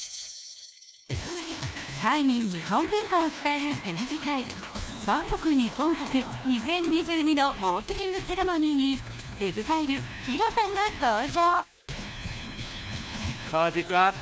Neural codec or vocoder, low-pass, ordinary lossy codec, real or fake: codec, 16 kHz, 1 kbps, FunCodec, trained on LibriTTS, 50 frames a second; none; none; fake